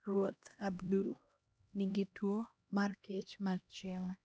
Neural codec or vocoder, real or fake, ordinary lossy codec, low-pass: codec, 16 kHz, 1 kbps, X-Codec, HuBERT features, trained on LibriSpeech; fake; none; none